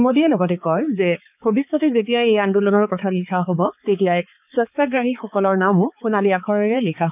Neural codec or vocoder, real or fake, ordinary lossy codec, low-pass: codec, 16 kHz, 4 kbps, X-Codec, HuBERT features, trained on balanced general audio; fake; none; 3.6 kHz